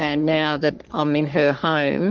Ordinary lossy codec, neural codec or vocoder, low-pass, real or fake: Opus, 24 kbps; codec, 44.1 kHz, 3.4 kbps, Pupu-Codec; 7.2 kHz; fake